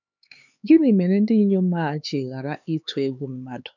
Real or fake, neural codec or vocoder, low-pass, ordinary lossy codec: fake; codec, 16 kHz, 4 kbps, X-Codec, HuBERT features, trained on LibriSpeech; 7.2 kHz; none